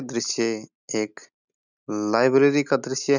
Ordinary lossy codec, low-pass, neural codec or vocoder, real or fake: none; 7.2 kHz; none; real